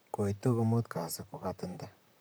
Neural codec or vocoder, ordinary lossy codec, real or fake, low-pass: vocoder, 44.1 kHz, 128 mel bands, Pupu-Vocoder; none; fake; none